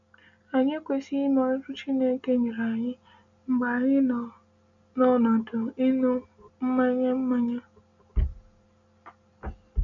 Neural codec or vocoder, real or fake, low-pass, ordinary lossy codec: none; real; 7.2 kHz; none